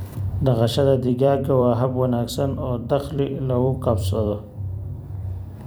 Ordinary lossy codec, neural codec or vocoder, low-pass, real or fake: none; vocoder, 44.1 kHz, 128 mel bands every 256 samples, BigVGAN v2; none; fake